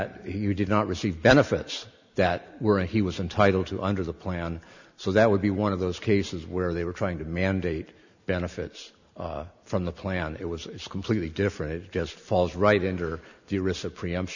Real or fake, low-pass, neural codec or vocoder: real; 7.2 kHz; none